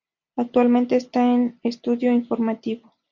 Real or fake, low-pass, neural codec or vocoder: real; 7.2 kHz; none